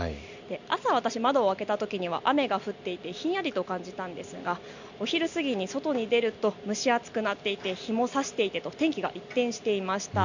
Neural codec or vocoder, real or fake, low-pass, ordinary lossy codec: none; real; 7.2 kHz; none